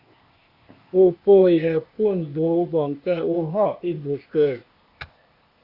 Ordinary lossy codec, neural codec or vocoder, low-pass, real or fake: Opus, 64 kbps; codec, 16 kHz, 0.8 kbps, ZipCodec; 5.4 kHz; fake